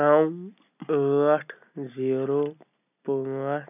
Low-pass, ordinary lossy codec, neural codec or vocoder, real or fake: 3.6 kHz; none; none; real